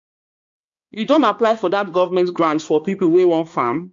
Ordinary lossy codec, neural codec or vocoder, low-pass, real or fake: MP3, 48 kbps; codec, 16 kHz, 2 kbps, X-Codec, HuBERT features, trained on balanced general audio; 7.2 kHz; fake